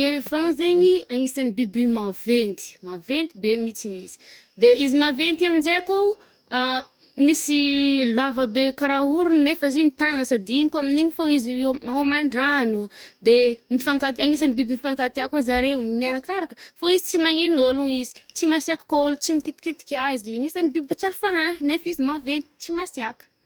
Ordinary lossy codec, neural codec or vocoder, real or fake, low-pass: none; codec, 44.1 kHz, 2.6 kbps, DAC; fake; none